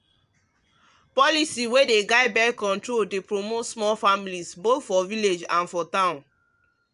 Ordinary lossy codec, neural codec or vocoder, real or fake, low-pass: none; vocoder, 24 kHz, 100 mel bands, Vocos; fake; 10.8 kHz